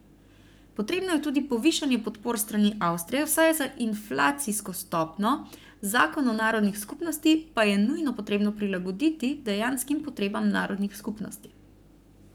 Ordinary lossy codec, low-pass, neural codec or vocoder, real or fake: none; none; codec, 44.1 kHz, 7.8 kbps, Pupu-Codec; fake